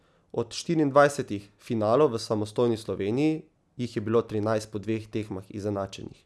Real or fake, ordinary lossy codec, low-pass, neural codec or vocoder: real; none; none; none